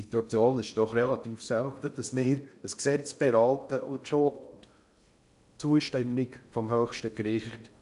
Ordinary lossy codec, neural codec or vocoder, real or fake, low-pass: none; codec, 16 kHz in and 24 kHz out, 0.6 kbps, FocalCodec, streaming, 2048 codes; fake; 10.8 kHz